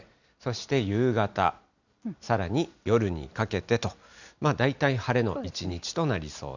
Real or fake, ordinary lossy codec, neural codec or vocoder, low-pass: real; none; none; 7.2 kHz